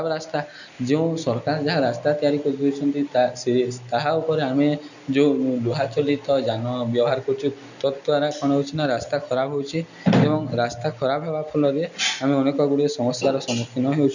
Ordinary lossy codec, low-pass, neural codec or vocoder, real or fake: none; 7.2 kHz; none; real